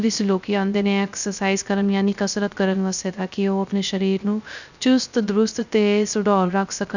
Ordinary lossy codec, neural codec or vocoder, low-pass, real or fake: none; codec, 16 kHz, 0.3 kbps, FocalCodec; 7.2 kHz; fake